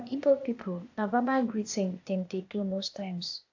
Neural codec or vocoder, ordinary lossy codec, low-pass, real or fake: codec, 16 kHz, 0.8 kbps, ZipCodec; AAC, 48 kbps; 7.2 kHz; fake